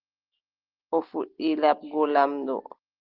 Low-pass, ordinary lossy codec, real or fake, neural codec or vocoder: 5.4 kHz; Opus, 16 kbps; real; none